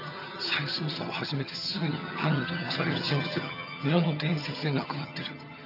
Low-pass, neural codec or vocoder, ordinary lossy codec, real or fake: 5.4 kHz; vocoder, 22.05 kHz, 80 mel bands, HiFi-GAN; none; fake